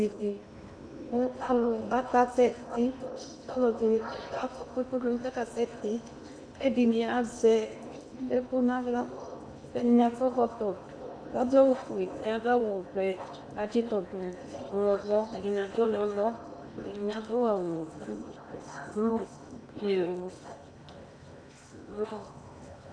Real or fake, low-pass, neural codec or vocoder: fake; 9.9 kHz; codec, 16 kHz in and 24 kHz out, 0.8 kbps, FocalCodec, streaming, 65536 codes